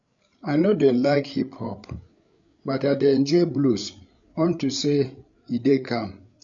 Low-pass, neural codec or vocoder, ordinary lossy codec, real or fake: 7.2 kHz; codec, 16 kHz, 8 kbps, FreqCodec, larger model; MP3, 64 kbps; fake